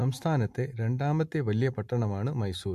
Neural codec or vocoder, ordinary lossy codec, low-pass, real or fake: none; MP3, 64 kbps; 14.4 kHz; real